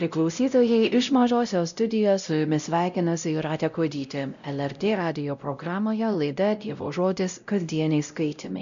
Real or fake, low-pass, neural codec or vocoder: fake; 7.2 kHz; codec, 16 kHz, 0.5 kbps, X-Codec, WavLM features, trained on Multilingual LibriSpeech